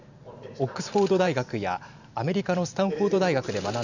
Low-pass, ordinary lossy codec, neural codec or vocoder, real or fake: 7.2 kHz; none; vocoder, 44.1 kHz, 128 mel bands every 512 samples, BigVGAN v2; fake